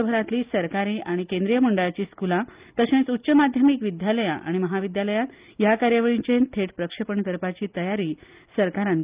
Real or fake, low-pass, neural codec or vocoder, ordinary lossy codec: real; 3.6 kHz; none; Opus, 24 kbps